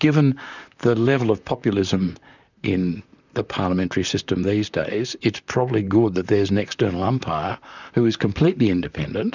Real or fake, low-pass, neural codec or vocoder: fake; 7.2 kHz; vocoder, 44.1 kHz, 128 mel bands, Pupu-Vocoder